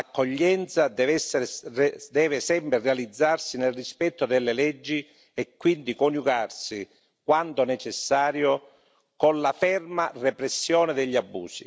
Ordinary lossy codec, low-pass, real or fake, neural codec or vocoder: none; none; real; none